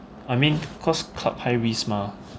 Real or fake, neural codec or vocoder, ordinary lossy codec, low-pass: real; none; none; none